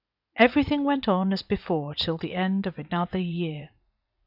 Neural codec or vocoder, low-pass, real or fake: vocoder, 44.1 kHz, 80 mel bands, Vocos; 5.4 kHz; fake